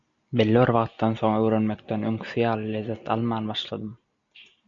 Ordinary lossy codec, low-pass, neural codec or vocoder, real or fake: MP3, 96 kbps; 7.2 kHz; none; real